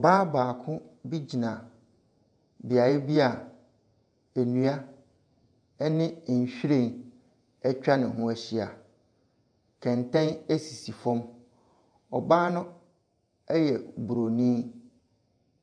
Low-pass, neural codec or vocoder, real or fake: 9.9 kHz; vocoder, 48 kHz, 128 mel bands, Vocos; fake